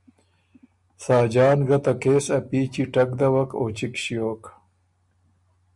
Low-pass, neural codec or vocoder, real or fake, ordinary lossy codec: 10.8 kHz; none; real; MP3, 96 kbps